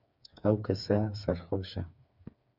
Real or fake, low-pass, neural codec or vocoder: fake; 5.4 kHz; codec, 16 kHz, 4 kbps, FreqCodec, smaller model